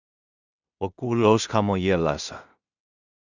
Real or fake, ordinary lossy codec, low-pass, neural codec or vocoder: fake; Opus, 64 kbps; 7.2 kHz; codec, 16 kHz in and 24 kHz out, 0.4 kbps, LongCat-Audio-Codec, two codebook decoder